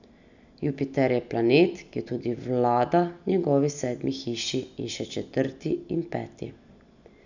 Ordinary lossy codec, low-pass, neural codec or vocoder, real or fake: none; 7.2 kHz; none; real